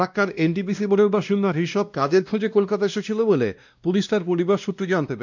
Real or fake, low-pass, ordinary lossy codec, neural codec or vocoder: fake; 7.2 kHz; none; codec, 16 kHz, 1 kbps, X-Codec, WavLM features, trained on Multilingual LibriSpeech